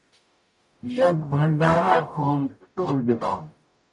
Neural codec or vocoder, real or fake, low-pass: codec, 44.1 kHz, 0.9 kbps, DAC; fake; 10.8 kHz